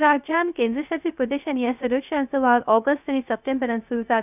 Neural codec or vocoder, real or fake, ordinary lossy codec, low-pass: codec, 16 kHz, 0.3 kbps, FocalCodec; fake; none; 3.6 kHz